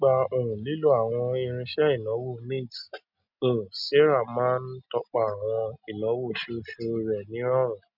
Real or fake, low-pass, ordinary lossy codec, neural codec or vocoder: real; 5.4 kHz; none; none